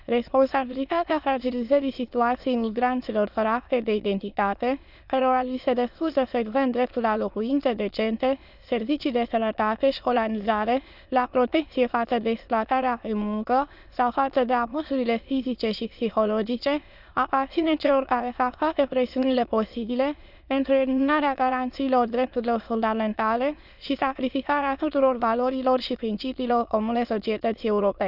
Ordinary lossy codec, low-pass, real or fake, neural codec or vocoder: none; 5.4 kHz; fake; autoencoder, 22.05 kHz, a latent of 192 numbers a frame, VITS, trained on many speakers